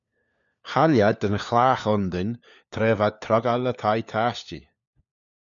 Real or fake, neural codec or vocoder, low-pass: fake; codec, 16 kHz, 4 kbps, FunCodec, trained on LibriTTS, 50 frames a second; 7.2 kHz